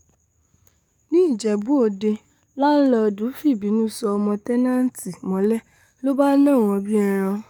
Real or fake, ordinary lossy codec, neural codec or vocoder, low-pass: fake; none; codec, 44.1 kHz, 7.8 kbps, DAC; 19.8 kHz